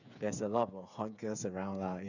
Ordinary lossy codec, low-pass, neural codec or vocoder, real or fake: none; 7.2 kHz; codec, 16 kHz, 8 kbps, FreqCodec, smaller model; fake